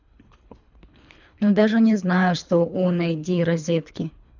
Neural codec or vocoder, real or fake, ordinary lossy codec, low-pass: codec, 24 kHz, 3 kbps, HILCodec; fake; none; 7.2 kHz